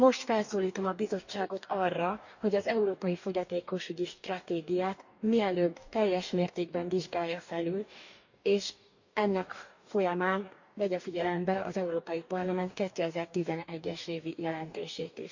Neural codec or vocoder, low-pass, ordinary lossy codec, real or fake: codec, 44.1 kHz, 2.6 kbps, DAC; 7.2 kHz; none; fake